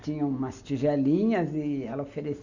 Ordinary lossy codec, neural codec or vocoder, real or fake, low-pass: none; none; real; 7.2 kHz